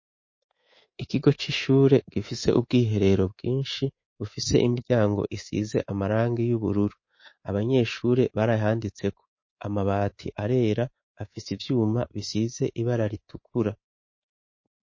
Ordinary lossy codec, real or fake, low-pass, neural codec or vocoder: MP3, 32 kbps; fake; 7.2 kHz; codec, 24 kHz, 3.1 kbps, DualCodec